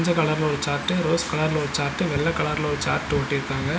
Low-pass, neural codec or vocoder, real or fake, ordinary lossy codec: none; none; real; none